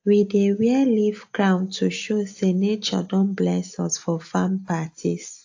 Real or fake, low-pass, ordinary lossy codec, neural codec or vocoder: real; 7.2 kHz; AAC, 48 kbps; none